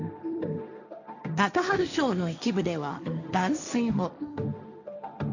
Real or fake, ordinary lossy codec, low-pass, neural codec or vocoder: fake; none; 7.2 kHz; codec, 16 kHz, 1.1 kbps, Voila-Tokenizer